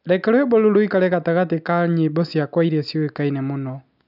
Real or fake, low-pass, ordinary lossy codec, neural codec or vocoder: real; 5.4 kHz; none; none